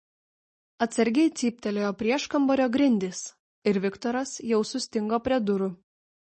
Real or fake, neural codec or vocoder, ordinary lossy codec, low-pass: real; none; MP3, 32 kbps; 10.8 kHz